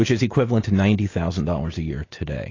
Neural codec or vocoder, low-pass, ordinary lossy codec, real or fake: none; 7.2 kHz; AAC, 32 kbps; real